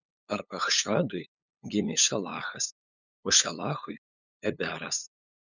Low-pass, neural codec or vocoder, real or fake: 7.2 kHz; codec, 16 kHz, 8 kbps, FunCodec, trained on LibriTTS, 25 frames a second; fake